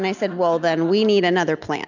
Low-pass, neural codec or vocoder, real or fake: 7.2 kHz; none; real